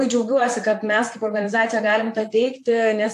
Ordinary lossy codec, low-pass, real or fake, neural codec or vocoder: AAC, 64 kbps; 14.4 kHz; fake; vocoder, 44.1 kHz, 128 mel bands, Pupu-Vocoder